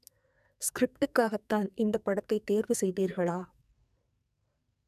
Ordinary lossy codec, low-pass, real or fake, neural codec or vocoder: none; 14.4 kHz; fake; codec, 44.1 kHz, 2.6 kbps, SNAC